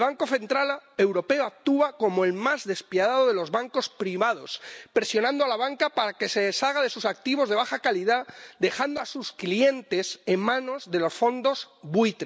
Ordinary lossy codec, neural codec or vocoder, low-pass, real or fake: none; none; none; real